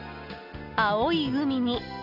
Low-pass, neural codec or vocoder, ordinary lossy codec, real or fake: 5.4 kHz; none; none; real